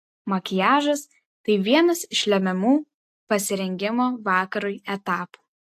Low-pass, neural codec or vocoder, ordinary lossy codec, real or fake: 14.4 kHz; none; AAC, 64 kbps; real